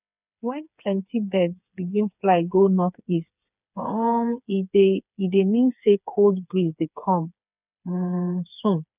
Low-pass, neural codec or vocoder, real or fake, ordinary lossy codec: 3.6 kHz; codec, 16 kHz, 4 kbps, FreqCodec, smaller model; fake; none